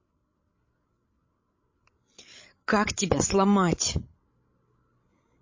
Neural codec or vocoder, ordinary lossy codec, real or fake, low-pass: codec, 16 kHz, 16 kbps, FreqCodec, larger model; MP3, 32 kbps; fake; 7.2 kHz